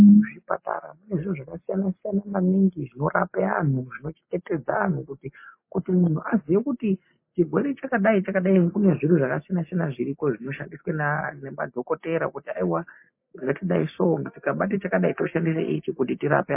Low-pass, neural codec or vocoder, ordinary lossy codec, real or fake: 3.6 kHz; none; MP3, 24 kbps; real